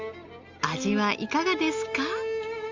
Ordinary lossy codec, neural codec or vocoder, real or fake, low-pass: Opus, 32 kbps; none; real; 7.2 kHz